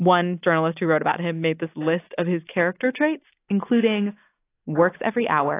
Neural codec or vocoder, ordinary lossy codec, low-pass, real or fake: none; AAC, 24 kbps; 3.6 kHz; real